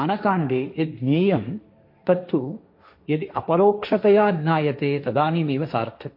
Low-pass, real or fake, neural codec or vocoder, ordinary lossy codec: 5.4 kHz; fake; codec, 16 kHz, 1.1 kbps, Voila-Tokenizer; MP3, 32 kbps